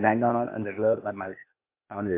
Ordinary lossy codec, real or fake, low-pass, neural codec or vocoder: MP3, 24 kbps; fake; 3.6 kHz; codec, 16 kHz, 0.8 kbps, ZipCodec